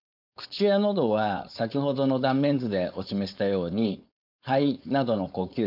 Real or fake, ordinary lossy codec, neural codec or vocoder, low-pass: fake; none; codec, 16 kHz, 4.8 kbps, FACodec; 5.4 kHz